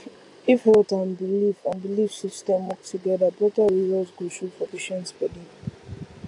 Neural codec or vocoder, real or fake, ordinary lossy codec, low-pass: none; real; AAC, 48 kbps; 10.8 kHz